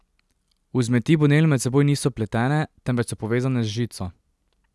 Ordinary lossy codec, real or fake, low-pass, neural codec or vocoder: none; real; none; none